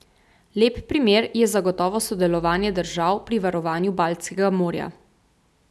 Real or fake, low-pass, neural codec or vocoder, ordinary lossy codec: real; none; none; none